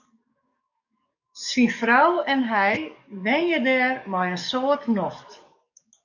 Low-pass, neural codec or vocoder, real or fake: 7.2 kHz; codec, 44.1 kHz, 7.8 kbps, DAC; fake